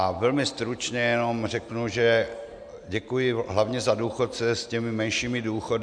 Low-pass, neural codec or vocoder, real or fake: 9.9 kHz; none; real